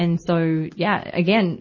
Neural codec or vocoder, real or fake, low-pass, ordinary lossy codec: none; real; 7.2 kHz; MP3, 32 kbps